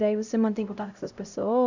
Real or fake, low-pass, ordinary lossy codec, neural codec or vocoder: fake; 7.2 kHz; none; codec, 16 kHz, 0.5 kbps, X-Codec, HuBERT features, trained on LibriSpeech